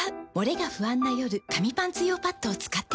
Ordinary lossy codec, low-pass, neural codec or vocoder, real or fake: none; none; none; real